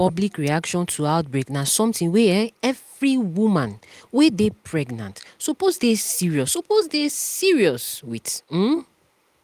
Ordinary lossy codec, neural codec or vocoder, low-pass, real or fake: Opus, 32 kbps; none; 14.4 kHz; real